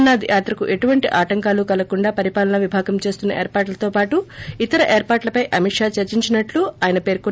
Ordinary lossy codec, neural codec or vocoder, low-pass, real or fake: none; none; none; real